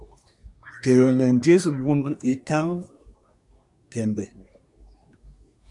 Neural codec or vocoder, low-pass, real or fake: codec, 24 kHz, 1 kbps, SNAC; 10.8 kHz; fake